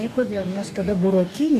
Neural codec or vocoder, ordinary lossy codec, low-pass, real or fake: codec, 44.1 kHz, 2.6 kbps, DAC; MP3, 64 kbps; 14.4 kHz; fake